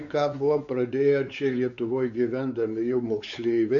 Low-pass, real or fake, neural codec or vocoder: 7.2 kHz; fake; codec, 16 kHz, 4 kbps, X-Codec, WavLM features, trained on Multilingual LibriSpeech